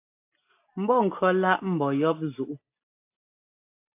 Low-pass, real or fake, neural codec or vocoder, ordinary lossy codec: 3.6 kHz; real; none; AAC, 24 kbps